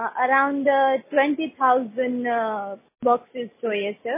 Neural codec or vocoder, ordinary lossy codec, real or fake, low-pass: none; MP3, 16 kbps; real; 3.6 kHz